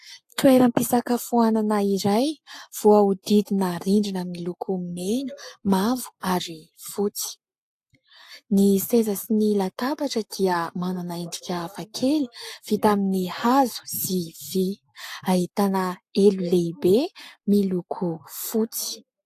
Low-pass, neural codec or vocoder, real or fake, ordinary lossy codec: 14.4 kHz; codec, 44.1 kHz, 7.8 kbps, Pupu-Codec; fake; AAC, 64 kbps